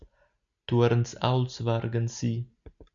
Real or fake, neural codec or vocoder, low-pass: real; none; 7.2 kHz